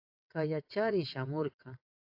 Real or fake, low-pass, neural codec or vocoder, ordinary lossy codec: fake; 5.4 kHz; vocoder, 22.05 kHz, 80 mel bands, WaveNeXt; AAC, 48 kbps